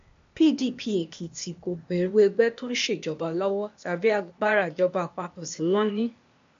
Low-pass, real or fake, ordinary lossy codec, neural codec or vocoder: 7.2 kHz; fake; MP3, 48 kbps; codec, 16 kHz, 0.8 kbps, ZipCodec